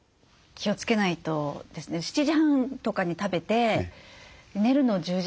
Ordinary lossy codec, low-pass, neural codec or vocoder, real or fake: none; none; none; real